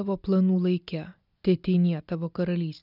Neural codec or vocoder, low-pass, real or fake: none; 5.4 kHz; real